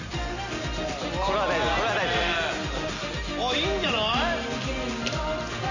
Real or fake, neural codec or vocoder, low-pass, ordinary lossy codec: real; none; 7.2 kHz; none